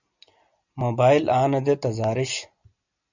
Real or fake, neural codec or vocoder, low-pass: real; none; 7.2 kHz